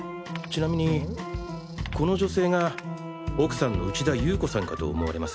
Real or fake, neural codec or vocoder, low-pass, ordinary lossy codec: real; none; none; none